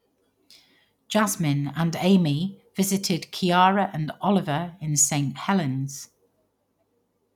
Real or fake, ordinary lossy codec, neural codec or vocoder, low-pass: real; none; none; 19.8 kHz